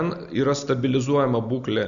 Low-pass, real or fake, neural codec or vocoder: 7.2 kHz; real; none